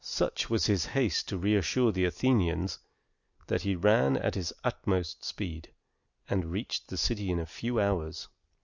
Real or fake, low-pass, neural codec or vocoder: real; 7.2 kHz; none